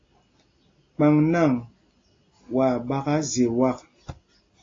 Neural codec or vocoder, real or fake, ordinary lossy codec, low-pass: none; real; AAC, 32 kbps; 7.2 kHz